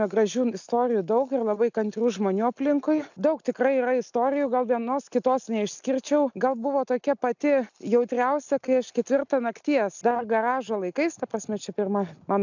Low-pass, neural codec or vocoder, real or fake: 7.2 kHz; none; real